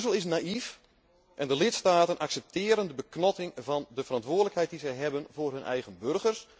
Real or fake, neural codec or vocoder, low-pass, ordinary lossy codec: real; none; none; none